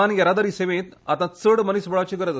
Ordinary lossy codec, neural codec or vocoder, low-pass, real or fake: none; none; none; real